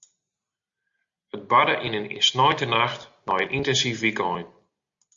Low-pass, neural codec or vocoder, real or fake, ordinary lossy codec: 7.2 kHz; none; real; Opus, 64 kbps